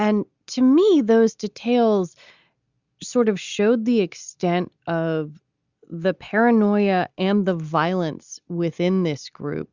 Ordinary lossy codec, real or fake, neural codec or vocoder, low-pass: Opus, 64 kbps; real; none; 7.2 kHz